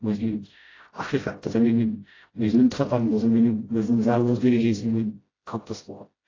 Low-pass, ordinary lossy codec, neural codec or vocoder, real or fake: 7.2 kHz; AAC, 32 kbps; codec, 16 kHz, 0.5 kbps, FreqCodec, smaller model; fake